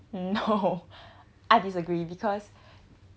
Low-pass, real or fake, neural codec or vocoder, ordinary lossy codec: none; real; none; none